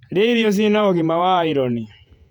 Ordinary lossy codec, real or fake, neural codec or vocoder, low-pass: none; fake; vocoder, 48 kHz, 128 mel bands, Vocos; 19.8 kHz